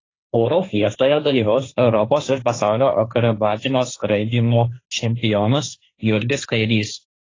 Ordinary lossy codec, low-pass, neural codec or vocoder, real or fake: AAC, 32 kbps; 7.2 kHz; codec, 16 kHz, 1.1 kbps, Voila-Tokenizer; fake